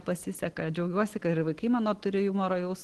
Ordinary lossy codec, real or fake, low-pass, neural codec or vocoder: Opus, 16 kbps; real; 10.8 kHz; none